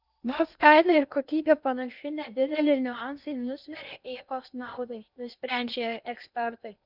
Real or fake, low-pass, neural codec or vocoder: fake; 5.4 kHz; codec, 16 kHz in and 24 kHz out, 0.8 kbps, FocalCodec, streaming, 65536 codes